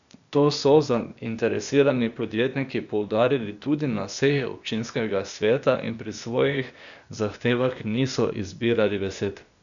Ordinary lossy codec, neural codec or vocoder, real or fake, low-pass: none; codec, 16 kHz, 0.8 kbps, ZipCodec; fake; 7.2 kHz